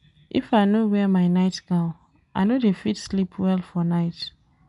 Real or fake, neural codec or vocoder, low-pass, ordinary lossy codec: real; none; 10.8 kHz; none